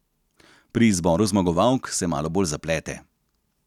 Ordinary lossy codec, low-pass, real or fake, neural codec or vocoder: none; 19.8 kHz; real; none